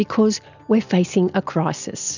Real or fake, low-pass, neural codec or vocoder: real; 7.2 kHz; none